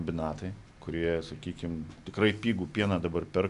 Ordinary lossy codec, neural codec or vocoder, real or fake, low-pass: MP3, 96 kbps; none; real; 10.8 kHz